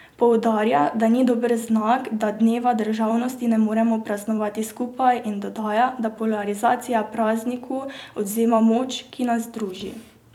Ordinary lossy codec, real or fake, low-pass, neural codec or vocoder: none; fake; 19.8 kHz; vocoder, 44.1 kHz, 128 mel bands every 256 samples, BigVGAN v2